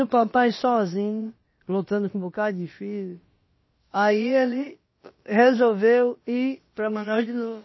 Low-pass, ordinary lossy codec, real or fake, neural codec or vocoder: 7.2 kHz; MP3, 24 kbps; fake; codec, 16 kHz, about 1 kbps, DyCAST, with the encoder's durations